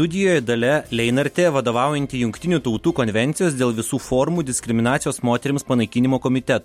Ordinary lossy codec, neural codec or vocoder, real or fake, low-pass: MP3, 64 kbps; none; real; 19.8 kHz